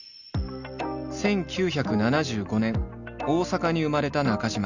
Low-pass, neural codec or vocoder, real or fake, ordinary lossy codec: 7.2 kHz; none; real; none